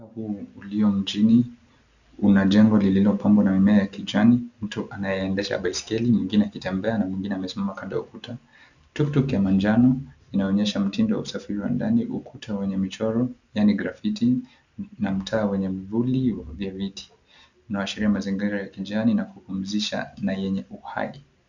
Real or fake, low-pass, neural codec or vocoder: real; 7.2 kHz; none